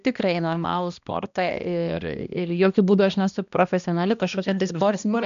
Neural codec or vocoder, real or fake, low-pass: codec, 16 kHz, 1 kbps, X-Codec, HuBERT features, trained on balanced general audio; fake; 7.2 kHz